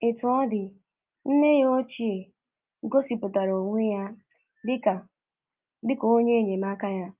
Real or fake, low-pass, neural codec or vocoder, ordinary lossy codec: real; 3.6 kHz; none; Opus, 24 kbps